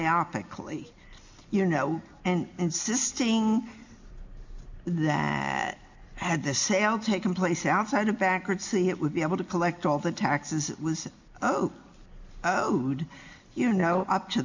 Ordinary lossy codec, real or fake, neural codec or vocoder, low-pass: MP3, 64 kbps; real; none; 7.2 kHz